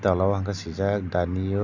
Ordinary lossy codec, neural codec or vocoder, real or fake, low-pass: none; none; real; 7.2 kHz